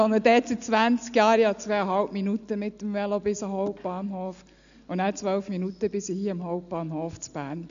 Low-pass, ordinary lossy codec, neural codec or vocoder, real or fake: 7.2 kHz; none; none; real